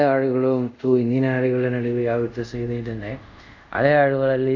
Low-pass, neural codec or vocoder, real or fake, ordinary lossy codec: 7.2 kHz; codec, 24 kHz, 0.5 kbps, DualCodec; fake; MP3, 64 kbps